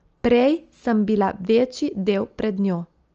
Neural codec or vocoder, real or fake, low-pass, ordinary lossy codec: none; real; 7.2 kHz; Opus, 24 kbps